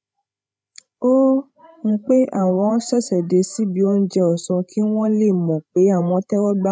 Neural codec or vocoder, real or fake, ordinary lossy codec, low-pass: codec, 16 kHz, 16 kbps, FreqCodec, larger model; fake; none; none